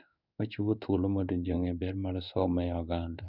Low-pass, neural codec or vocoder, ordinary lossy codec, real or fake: 5.4 kHz; codec, 16 kHz in and 24 kHz out, 1 kbps, XY-Tokenizer; none; fake